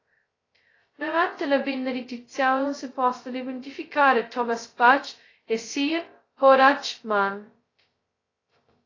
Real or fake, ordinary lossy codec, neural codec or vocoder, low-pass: fake; AAC, 32 kbps; codec, 16 kHz, 0.2 kbps, FocalCodec; 7.2 kHz